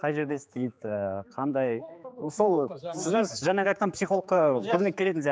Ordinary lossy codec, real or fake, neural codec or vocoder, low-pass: none; fake; codec, 16 kHz, 4 kbps, X-Codec, HuBERT features, trained on general audio; none